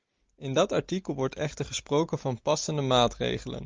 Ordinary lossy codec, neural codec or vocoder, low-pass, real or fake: Opus, 24 kbps; none; 7.2 kHz; real